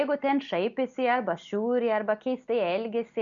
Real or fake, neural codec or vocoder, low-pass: real; none; 7.2 kHz